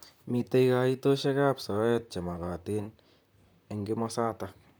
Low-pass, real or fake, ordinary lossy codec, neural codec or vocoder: none; fake; none; vocoder, 44.1 kHz, 128 mel bands, Pupu-Vocoder